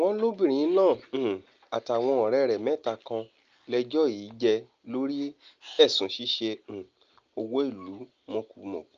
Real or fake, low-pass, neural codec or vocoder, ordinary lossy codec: real; 7.2 kHz; none; Opus, 24 kbps